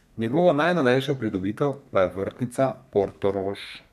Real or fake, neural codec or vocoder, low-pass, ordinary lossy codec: fake; codec, 32 kHz, 1.9 kbps, SNAC; 14.4 kHz; none